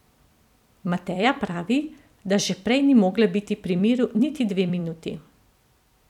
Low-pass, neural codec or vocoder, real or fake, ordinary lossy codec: 19.8 kHz; vocoder, 44.1 kHz, 128 mel bands every 256 samples, BigVGAN v2; fake; none